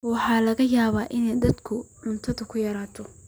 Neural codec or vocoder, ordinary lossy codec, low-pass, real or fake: none; none; none; real